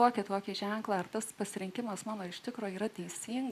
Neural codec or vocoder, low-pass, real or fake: none; 14.4 kHz; real